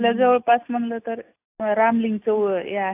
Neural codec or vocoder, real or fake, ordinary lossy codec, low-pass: none; real; AAC, 24 kbps; 3.6 kHz